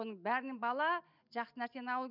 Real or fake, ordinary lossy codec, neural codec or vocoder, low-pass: real; none; none; 5.4 kHz